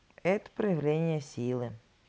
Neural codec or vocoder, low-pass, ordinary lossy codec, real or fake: none; none; none; real